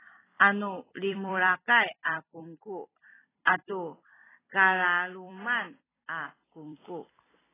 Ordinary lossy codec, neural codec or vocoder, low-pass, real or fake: AAC, 16 kbps; vocoder, 44.1 kHz, 128 mel bands every 256 samples, BigVGAN v2; 3.6 kHz; fake